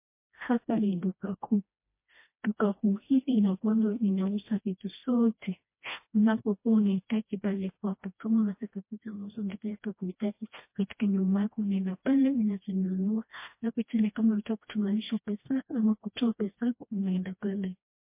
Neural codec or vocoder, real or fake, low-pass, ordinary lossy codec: codec, 16 kHz, 1 kbps, FreqCodec, smaller model; fake; 3.6 kHz; MP3, 24 kbps